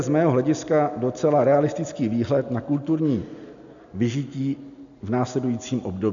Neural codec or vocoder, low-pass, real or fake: none; 7.2 kHz; real